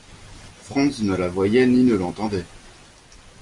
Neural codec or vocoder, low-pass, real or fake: none; 10.8 kHz; real